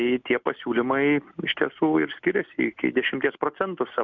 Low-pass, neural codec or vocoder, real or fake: 7.2 kHz; none; real